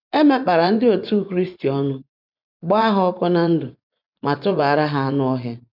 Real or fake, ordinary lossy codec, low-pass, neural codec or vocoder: fake; none; 5.4 kHz; vocoder, 24 kHz, 100 mel bands, Vocos